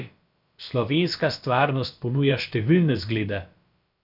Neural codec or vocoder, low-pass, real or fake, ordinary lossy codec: codec, 16 kHz, about 1 kbps, DyCAST, with the encoder's durations; 5.4 kHz; fake; none